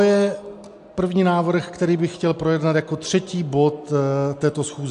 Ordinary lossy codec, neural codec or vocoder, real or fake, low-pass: AAC, 64 kbps; none; real; 10.8 kHz